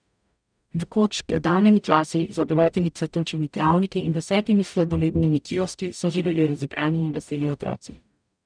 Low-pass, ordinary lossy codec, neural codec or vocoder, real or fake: 9.9 kHz; none; codec, 44.1 kHz, 0.9 kbps, DAC; fake